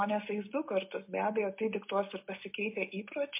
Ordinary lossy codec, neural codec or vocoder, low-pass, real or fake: MP3, 24 kbps; none; 3.6 kHz; real